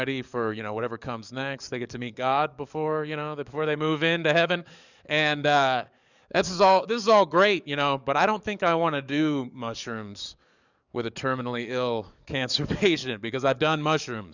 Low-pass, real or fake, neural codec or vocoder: 7.2 kHz; fake; codec, 44.1 kHz, 7.8 kbps, DAC